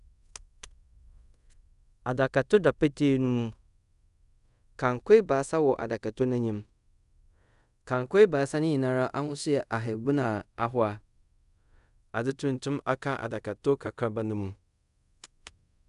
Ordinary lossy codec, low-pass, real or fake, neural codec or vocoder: none; 10.8 kHz; fake; codec, 24 kHz, 0.5 kbps, DualCodec